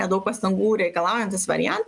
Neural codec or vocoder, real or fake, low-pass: none; real; 10.8 kHz